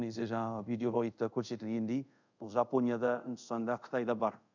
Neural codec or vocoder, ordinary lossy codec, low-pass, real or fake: codec, 24 kHz, 0.5 kbps, DualCodec; none; 7.2 kHz; fake